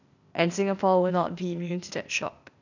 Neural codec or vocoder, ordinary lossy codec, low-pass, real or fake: codec, 16 kHz, 0.8 kbps, ZipCodec; none; 7.2 kHz; fake